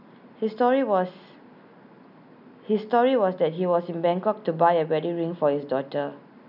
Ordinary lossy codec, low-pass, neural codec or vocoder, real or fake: none; 5.4 kHz; none; real